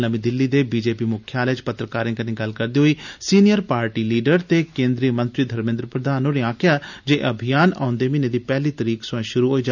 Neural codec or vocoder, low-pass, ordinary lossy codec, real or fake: none; 7.2 kHz; none; real